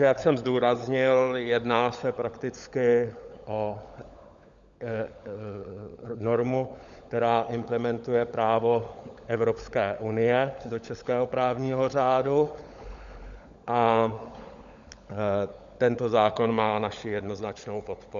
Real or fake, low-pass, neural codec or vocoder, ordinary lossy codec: fake; 7.2 kHz; codec, 16 kHz, 16 kbps, FunCodec, trained on LibriTTS, 50 frames a second; Opus, 64 kbps